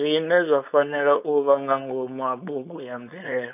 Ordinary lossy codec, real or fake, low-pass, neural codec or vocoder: none; fake; 3.6 kHz; codec, 16 kHz, 4.8 kbps, FACodec